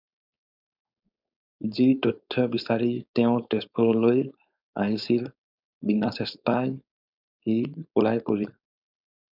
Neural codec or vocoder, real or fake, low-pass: codec, 16 kHz, 4.8 kbps, FACodec; fake; 5.4 kHz